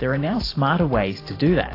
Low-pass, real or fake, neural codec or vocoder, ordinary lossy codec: 5.4 kHz; fake; vocoder, 44.1 kHz, 128 mel bands every 512 samples, BigVGAN v2; AAC, 24 kbps